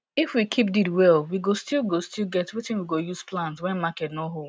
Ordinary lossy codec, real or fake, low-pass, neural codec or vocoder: none; real; none; none